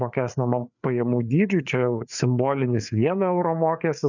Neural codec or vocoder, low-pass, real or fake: codec, 16 kHz, 4 kbps, FreqCodec, larger model; 7.2 kHz; fake